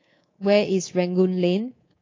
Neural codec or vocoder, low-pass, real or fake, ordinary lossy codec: codec, 24 kHz, 3.1 kbps, DualCodec; 7.2 kHz; fake; AAC, 32 kbps